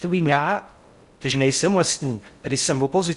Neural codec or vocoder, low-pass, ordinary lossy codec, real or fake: codec, 16 kHz in and 24 kHz out, 0.6 kbps, FocalCodec, streaming, 4096 codes; 10.8 kHz; Opus, 64 kbps; fake